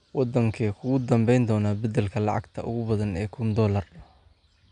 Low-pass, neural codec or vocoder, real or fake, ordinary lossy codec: 9.9 kHz; none; real; none